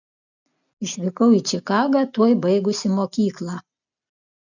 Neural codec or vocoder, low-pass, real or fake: none; 7.2 kHz; real